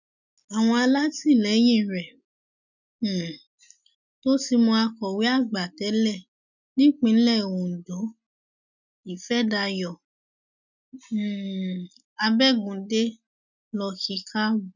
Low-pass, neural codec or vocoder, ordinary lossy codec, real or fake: 7.2 kHz; none; none; real